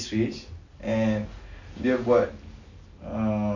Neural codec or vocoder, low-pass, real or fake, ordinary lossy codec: none; 7.2 kHz; real; none